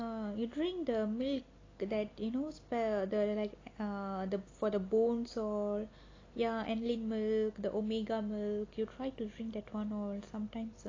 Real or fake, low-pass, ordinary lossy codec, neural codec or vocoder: real; 7.2 kHz; AAC, 32 kbps; none